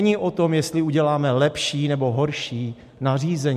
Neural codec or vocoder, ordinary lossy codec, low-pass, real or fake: none; MP3, 64 kbps; 14.4 kHz; real